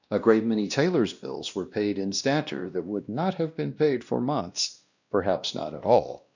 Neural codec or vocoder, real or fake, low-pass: codec, 16 kHz, 1 kbps, X-Codec, WavLM features, trained on Multilingual LibriSpeech; fake; 7.2 kHz